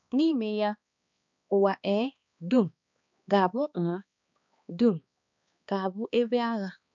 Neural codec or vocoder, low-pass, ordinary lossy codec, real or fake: codec, 16 kHz, 2 kbps, X-Codec, HuBERT features, trained on balanced general audio; 7.2 kHz; MP3, 64 kbps; fake